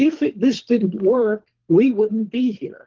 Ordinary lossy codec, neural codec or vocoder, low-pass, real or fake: Opus, 16 kbps; codec, 24 kHz, 3 kbps, HILCodec; 7.2 kHz; fake